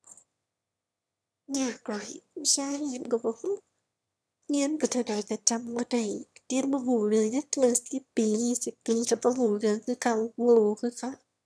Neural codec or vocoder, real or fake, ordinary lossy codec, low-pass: autoencoder, 22.05 kHz, a latent of 192 numbers a frame, VITS, trained on one speaker; fake; none; none